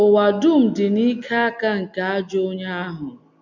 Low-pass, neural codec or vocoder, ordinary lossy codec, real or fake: 7.2 kHz; none; none; real